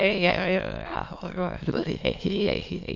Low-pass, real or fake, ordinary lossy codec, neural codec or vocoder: 7.2 kHz; fake; MP3, 48 kbps; autoencoder, 22.05 kHz, a latent of 192 numbers a frame, VITS, trained on many speakers